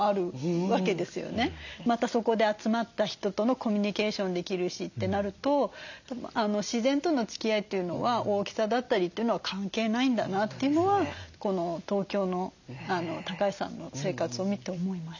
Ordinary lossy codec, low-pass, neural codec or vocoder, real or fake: none; 7.2 kHz; none; real